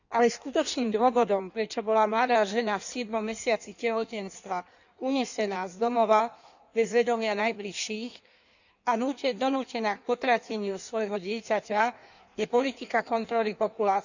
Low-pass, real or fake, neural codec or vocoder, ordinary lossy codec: 7.2 kHz; fake; codec, 16 kHz in and 24 kHz out, 1.1 kbps, FireRedTTS-2 codec; none